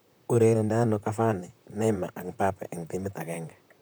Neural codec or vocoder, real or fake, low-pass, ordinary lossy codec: vocoder, 44.1 kHz, 128 mel bands, Pupu-Vocoder; fake; none; none